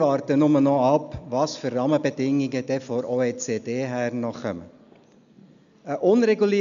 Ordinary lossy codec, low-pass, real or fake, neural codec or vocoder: MP3, 96 kbps; 7.2 kHz; real; none